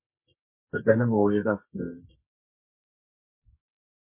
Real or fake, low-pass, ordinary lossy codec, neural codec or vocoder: fake; 3.6 kHz; MP3, 24 kbps; codec, 24 kHz, 0.9 kbps, WavTokenizer, medium music audio release